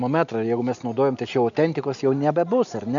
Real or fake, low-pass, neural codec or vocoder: real; 7.2 kHz; none